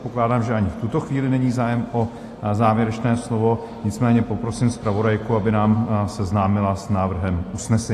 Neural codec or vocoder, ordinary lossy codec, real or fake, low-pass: none; AAC, 48 kbps; real; 14.4 kHz